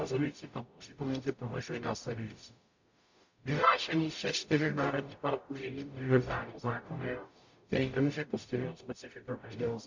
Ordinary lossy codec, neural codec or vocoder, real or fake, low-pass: MP3, 48 kbps; codec, 44.1 kHz, 0.9 kbps, DAC; fake; 7.2 kHz